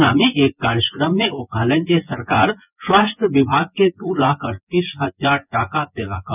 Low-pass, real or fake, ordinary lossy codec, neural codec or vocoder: 3.6 kHz; fake; none; vocoder, 24 kHz, 100 mel bands, Vocos